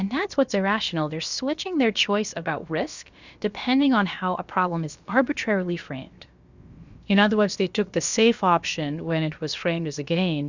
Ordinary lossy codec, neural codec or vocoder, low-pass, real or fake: Opus, 64 kbps; codec, 16 kHz, about 1 kbps, DyCAST, with the encoder's durations; 7.2 kHz; fake